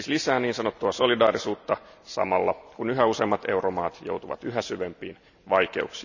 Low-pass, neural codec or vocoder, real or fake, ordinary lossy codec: 7.2 kHz; none; real; none